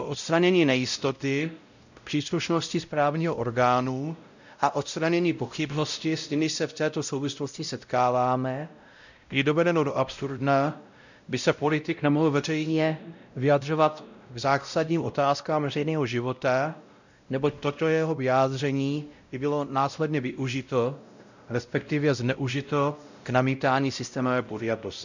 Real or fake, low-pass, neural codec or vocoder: fake; 7.2 kHz; codec, 16 kHz, 0.5 kbps, X-Codec, WavLM features, trained on Multilingual LibriSpeech